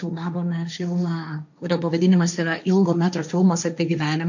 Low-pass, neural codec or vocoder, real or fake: 7.2 kHz; codec, 16 kHz, 1.1 kbps, Voila-Tokenizer; fake